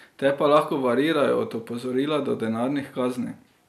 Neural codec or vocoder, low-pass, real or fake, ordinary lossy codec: none; 14.4 kHz; real; none